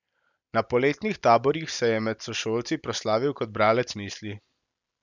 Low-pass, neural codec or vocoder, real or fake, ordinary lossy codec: 7.2 kHz; none; real; none